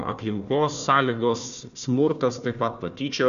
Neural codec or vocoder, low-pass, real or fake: codec, 16 kHz, 1 kbps, FunCodec, trained on Chinese and English, 50 frames a second; 7.2 kHz; fake